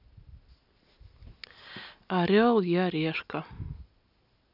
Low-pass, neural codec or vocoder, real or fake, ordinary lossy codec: 5.4 kHz; none; real; none